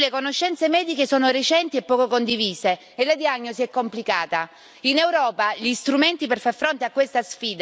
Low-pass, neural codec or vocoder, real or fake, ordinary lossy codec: none; none; real; none